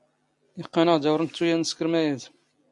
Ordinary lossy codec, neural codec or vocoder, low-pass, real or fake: MP3, 64 kbps; none; 10.8 kHz; real